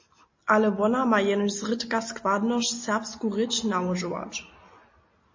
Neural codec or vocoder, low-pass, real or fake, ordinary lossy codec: none; 7.2 kHz; real; MP3, 32 kbps